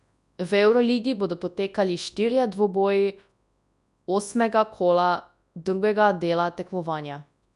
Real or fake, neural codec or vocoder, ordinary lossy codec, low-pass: fake; codec, 24 kHz, 0.9 kbps, WavTokenizer, large speech release; none; 10.8 kHz